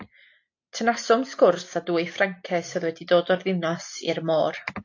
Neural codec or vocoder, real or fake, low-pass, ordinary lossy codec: none; real; 7.2 kHz; AAC, 48 kbps